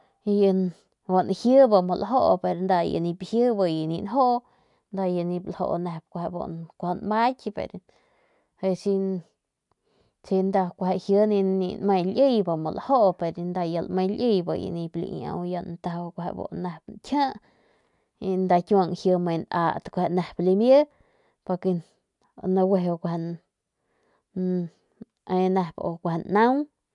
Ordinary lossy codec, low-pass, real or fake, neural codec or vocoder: none; 10.8 kHz; real; none